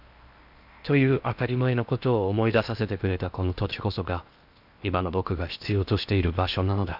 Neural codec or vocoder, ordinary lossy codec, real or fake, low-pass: codec, 16 kHz in and 24 kHz out, 0.8 kbps, FocalCodec, streaming, 65536 codes; none; fake; 5.4 kHz